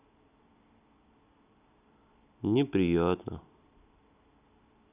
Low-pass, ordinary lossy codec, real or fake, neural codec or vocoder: 3.6 kHz; none; real; none